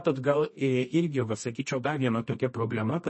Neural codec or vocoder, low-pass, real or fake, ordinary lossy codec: codec, 24 kHz, 0.9 kbps, WavTokenizer, medium music audio release; 9.9 kHz; fake; MP3, 32 kbps